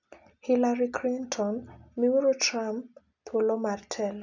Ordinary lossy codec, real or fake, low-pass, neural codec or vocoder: none; real; 7.2 kHz; none